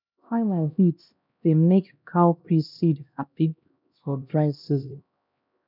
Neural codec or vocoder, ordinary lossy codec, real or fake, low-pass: codec, 16 kHz, 1 kbps, X-Codec, HuBERT features, trained on LibriSpeech; none; fake; 5.4 kHz